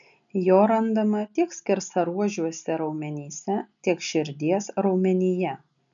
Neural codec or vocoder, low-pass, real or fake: none; 7.2 kHz; real